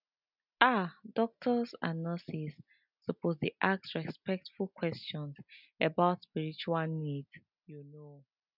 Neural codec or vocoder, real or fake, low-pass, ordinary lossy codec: none; real; 5.4 kHz; none